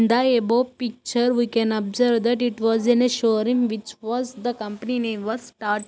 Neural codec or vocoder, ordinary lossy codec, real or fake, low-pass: none; none; real; none